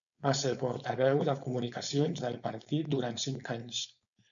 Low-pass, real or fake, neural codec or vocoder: 7.2 kHz; fake; codec, 16 kHz, 4.8 kbps, FACodec